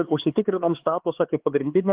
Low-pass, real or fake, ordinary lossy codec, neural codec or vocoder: 3.6 kHz; fake; Opus, 16 kbps; codec, 16 kHz, 4 kbps, X-Codec, HuBERT features, trained on LibriSpeech